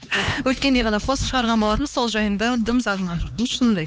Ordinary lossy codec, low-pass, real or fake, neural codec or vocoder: none; none; fake; codec, 16 kHz, 2 kbps, X-Codec, HuBERT features, trained on LibriSpeech